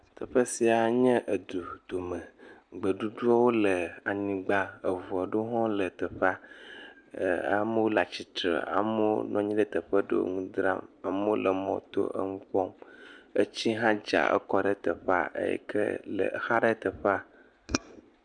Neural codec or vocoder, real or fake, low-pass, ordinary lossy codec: none; real; 9.9 kHz; MP3, 96 kbps